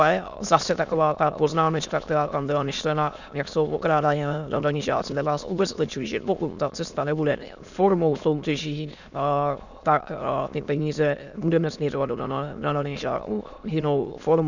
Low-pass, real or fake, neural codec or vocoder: 7.2 kHz; fake; autoencoder, 22.05 kHz, a latent of 192 numbers a frame, VITS, trained on many speakers